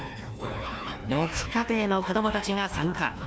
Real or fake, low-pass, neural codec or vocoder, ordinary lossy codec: fake; none; codec, 16 kHz, 1 kbps, FunCodec, trained on Chinese and English, 50 frames a second; none